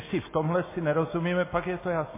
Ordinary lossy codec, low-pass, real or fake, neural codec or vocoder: MP3, 16 kbps; 3.6 kHz; real; none